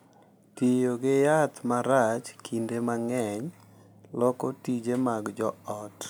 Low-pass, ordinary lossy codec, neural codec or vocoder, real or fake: none; none; none; real